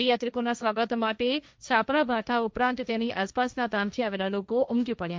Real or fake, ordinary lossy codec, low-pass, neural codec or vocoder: fake; none; none; codec, 16 kHz, 1.1 kbps, Voila-Tokenizer